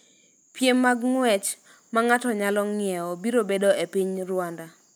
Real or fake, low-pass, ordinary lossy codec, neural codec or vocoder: real; none; none; none